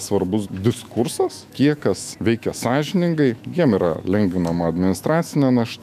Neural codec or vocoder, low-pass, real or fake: autoencoder, 48 kHz, 128 numbers a frame, DAC-VAE, trained on Japanese speech; 14.4 kHz; fake